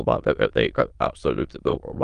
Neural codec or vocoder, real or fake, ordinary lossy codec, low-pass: autoencoder, 22.05 kHz, a latent of 192 numbers a frame, VITS, trained on many speakers; fake; Opus, 32 kbps; 9.9 kHz